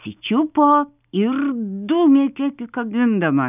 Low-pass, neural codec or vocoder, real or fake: 3.6 kHz; none; real